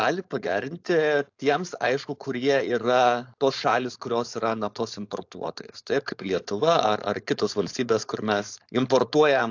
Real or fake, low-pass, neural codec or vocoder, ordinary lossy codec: fake; 7.2 kHz; codec, 16 kHz, 4.8 kbps, FACodec; AAC, 48 kbps